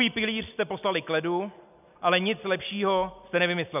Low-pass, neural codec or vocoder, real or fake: 3.6 kHz; none; real